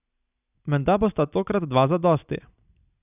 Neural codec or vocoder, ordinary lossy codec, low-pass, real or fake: none; none; 3.6 kHz; real